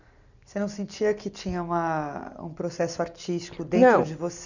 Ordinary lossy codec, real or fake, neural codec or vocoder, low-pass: none; real; none; 7.2 kHz